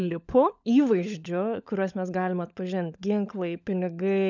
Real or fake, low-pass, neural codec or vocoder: fake; 7.2 kHz; codec, 16 kHz, 16 kbps, FunCodec, trained on LibriTTS, 50 frames a second